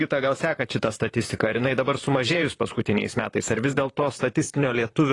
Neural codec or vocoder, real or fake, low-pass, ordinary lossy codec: vocoder, 44.1 kHz, 128 mel bands, Pupu-Vocoder; fake; 10.8 kHz; AAC, 32 kbps